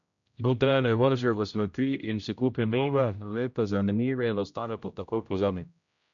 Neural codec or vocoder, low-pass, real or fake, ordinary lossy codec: codec, 16 kHz, 0.5 kbps, X-Codec, HuBERT features, trained on general audio; 7.2 kHz; fake; none